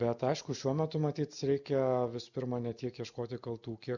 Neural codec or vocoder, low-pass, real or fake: none; 7.2 kHz; real